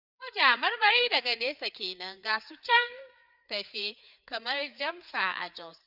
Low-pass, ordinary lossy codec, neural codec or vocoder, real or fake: 5.4 kHz; none; codec, 16 kHz, 4 kbps, FreqCodec, larger model; fake